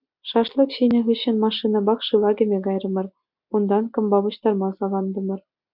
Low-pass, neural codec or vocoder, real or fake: 5.4 kHz; none; real